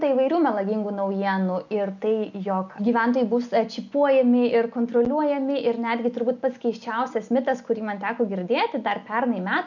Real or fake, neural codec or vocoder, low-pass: real; none; 7.2 kHz